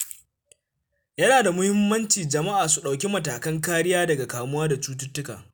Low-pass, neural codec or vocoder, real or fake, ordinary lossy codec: none; none; real; none